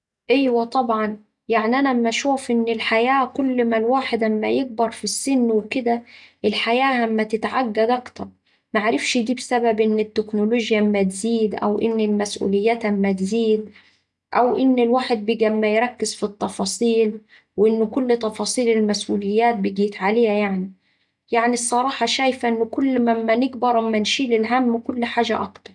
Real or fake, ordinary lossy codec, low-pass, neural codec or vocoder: real; none; 10.8 kHz; none